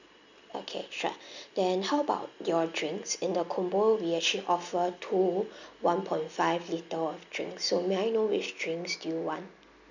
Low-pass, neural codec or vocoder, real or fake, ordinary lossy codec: 7.2 kHz; none; real; none